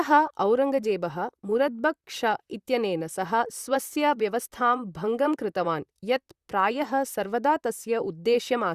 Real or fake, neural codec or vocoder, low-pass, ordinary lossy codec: fake; vocoder, 44.1 kHz, 128 mel bands, Pupu-Vocoder; 14.4 kHz; Opus, 64 kbps